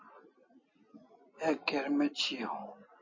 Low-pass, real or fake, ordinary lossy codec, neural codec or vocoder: 7.2 kHz; real; MP3, 32 kbps; none